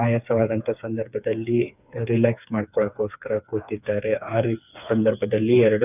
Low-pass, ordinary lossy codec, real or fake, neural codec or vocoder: 3.6 kHz; none; fake; codec, 16 kHz, 4 kbps, FreqCodec, smaller model